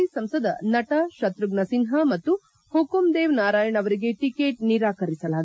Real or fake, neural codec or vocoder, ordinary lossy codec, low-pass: real; none; none; none